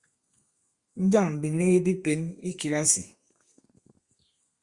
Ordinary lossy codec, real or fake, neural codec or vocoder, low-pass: Opus, 64 kbps; fake; codec, 32 kHz, 1.9 kbps, SNAC; 10.8 kHz